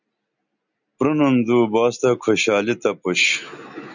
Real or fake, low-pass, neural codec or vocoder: real; 7.2 kHz; none